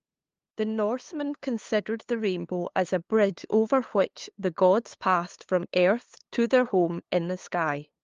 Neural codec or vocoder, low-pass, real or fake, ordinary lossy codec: codec, 16 kHz, 2 kbps, FunCodec, trained on LibriTTS, 25 frames a second; 7.2 kHz; fake; Opus, 32 kbps